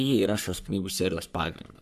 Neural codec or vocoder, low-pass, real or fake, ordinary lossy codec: codec, 44.1 kHz, 3.4 kbps, Pupu-Codec; 14.4 kHz; fake; AAC, 96 kbps